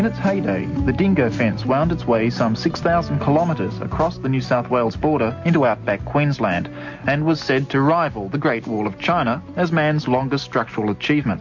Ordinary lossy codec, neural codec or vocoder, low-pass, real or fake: MP3, 48 kbps; none; 7.2 kHz; real